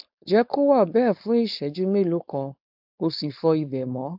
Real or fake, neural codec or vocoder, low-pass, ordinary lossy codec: fake; codec, 16 kHz, 4.8 kbps, FACodec; 5.4 kHz; AAC, 48 kbps